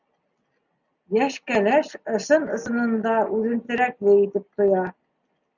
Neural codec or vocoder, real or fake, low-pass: none; real; 7.2 kHz